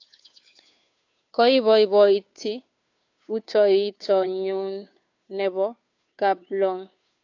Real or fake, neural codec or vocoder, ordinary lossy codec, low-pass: fake; codec, 16 kHz, 2 kbps, FunCodec, trained on Chinese and English, 25 frames a second; AAC, 48 kbps; 7.2 kHz